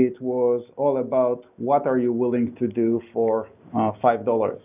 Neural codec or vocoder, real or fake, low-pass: none; real; 3.6 kHz